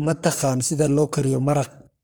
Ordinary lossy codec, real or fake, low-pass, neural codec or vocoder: none; fake; none; codec, 44.1 kHz, 3.4 kbps, Pupu-Codec